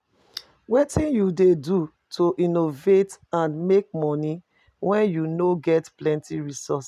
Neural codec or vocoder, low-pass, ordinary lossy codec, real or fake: none; 14.4 kHz; none; real